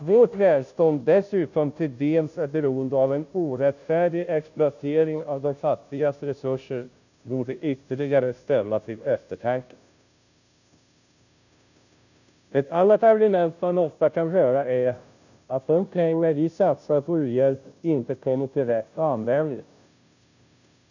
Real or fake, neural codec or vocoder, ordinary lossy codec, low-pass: fake; codec, 16 kHz, 0.5 kbps, FunCodec, trained on Chinese and English, 25 frames a second; none; 7.2 kHz